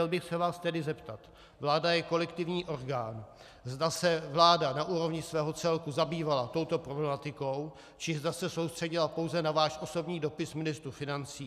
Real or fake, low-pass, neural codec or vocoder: real; 14.4 kHz; none